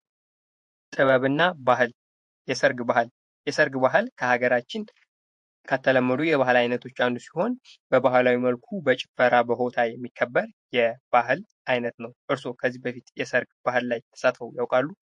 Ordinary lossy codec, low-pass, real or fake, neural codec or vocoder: MP3, 48 kbps; 10.8 kHz; fake; vocoder, 48 kHz, 128 mel bands, Vocos